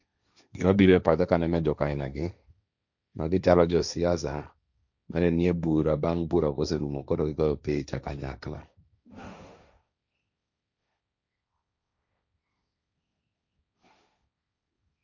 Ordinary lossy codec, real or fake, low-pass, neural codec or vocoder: none; fake; 7.2 kHz; codec, 16 kHz, 1.1 kbps, Voila-Tokenizer